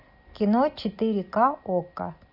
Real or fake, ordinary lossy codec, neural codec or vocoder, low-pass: real; AAC, 48 kbps; none; 5.4 kHz